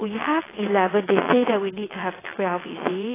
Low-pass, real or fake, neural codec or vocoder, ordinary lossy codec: 3.6 kHz; fake; vocoder, 22.05 kHz, 80 mel bands, WaveNeXt; AAC, 16 kbps